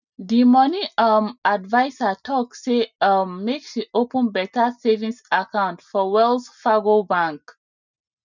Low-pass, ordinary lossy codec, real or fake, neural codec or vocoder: 7.2 kHz; none; real; none